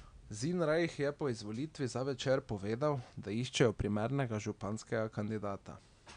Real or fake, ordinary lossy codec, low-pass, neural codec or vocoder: real; none; 9.9 kHz; none